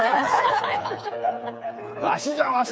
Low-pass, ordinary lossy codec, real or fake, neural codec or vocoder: none; none; fake; codec, 16 kHz, 4 kbps, FreqCodec, smaller model